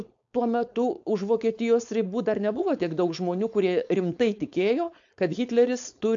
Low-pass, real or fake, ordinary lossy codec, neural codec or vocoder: 7.2 kHz; fake; AAC, 64 kbps; codec, 16 kHz, 4.8 kbps, FACodec